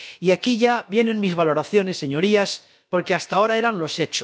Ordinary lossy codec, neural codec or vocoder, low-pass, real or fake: none; codec, 16 kHz, about 1 kbps, DyCAST, with the encoder's durations; none; fake